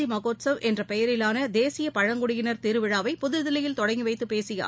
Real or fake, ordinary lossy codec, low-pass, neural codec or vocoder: real; none; none; none